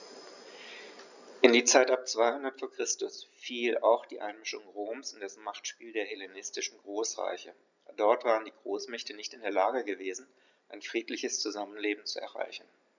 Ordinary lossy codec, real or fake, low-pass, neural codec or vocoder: none; real; 7.2 kHz; none